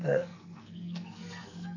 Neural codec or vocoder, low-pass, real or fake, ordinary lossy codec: codec, 44.1 kHz, 2.6 kbps, SNAC; 7.2 kHz; fake; none